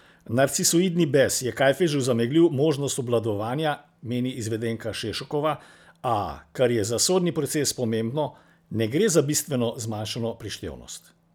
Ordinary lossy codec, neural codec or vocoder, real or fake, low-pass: none; none; real; none